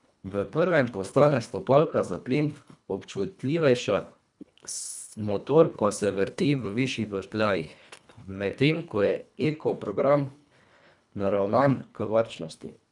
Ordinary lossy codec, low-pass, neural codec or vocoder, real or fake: none; 10.8 kHz; codec, 24 kHz, 1.5 kbps, HILCodec; fake